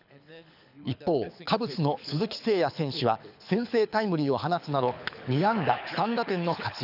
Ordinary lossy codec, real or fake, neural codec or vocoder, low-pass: none; fake; codec, 24 kHz, 6 kbps, HILCodec; 5.4 kHz